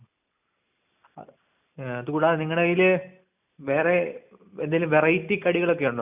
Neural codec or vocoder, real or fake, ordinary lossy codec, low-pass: none; real; none; 3.6 kHz